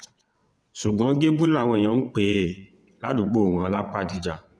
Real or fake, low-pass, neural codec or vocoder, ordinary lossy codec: fake; none; vocoder, 22.05 kHz, 80 mel bands, WaveNeXt; none